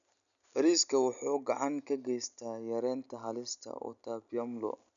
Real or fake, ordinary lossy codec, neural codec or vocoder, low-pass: real; none; none; 7.2 kHz